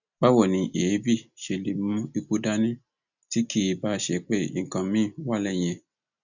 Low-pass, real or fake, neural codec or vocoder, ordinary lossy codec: 7.2 kHz; real; none; none